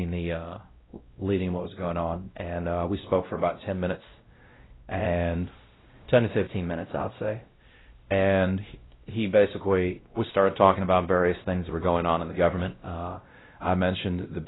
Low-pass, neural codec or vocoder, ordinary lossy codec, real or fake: 7.2 kHz; codec, 16 kHz, 0.5 kbps, X-Codec, WavLM features, trained on Multilingual LibriSpeech; AAC, 16 kbps; fake